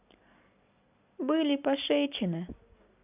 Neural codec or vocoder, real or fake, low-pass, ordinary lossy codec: none; real; 3.6 kHz; none